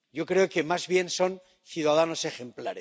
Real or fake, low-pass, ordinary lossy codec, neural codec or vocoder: real; none; none; none